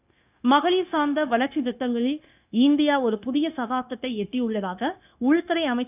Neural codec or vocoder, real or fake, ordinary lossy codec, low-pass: codec, 16 kHz, 0.9 kbps, LongCat-Audio-Codec; fake; none; 3.6 kHz